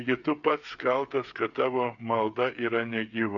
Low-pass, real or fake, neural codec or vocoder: 7.2 kHz; fake; codec, 16 kHz, 8 kbps, FreqCodec, smaller model